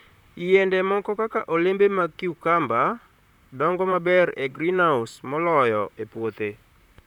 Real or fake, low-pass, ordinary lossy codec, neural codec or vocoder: fake; 19.8 kHz; none; vocoder, 44.1 kHz, 128 mel bands, Pupu-Vocoder